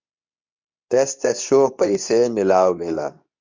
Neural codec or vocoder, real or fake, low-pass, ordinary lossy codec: codec, 24 kHz, 0.9 kbps, WavTokenizer, medium speech release version 2; fake; 7.2 kHz; MP3, 64 kbps